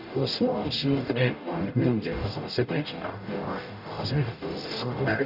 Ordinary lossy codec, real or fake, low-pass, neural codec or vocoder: none; fake; 5.4 kHz; codec, 44.1 kHz, 0.9 kbps, DAC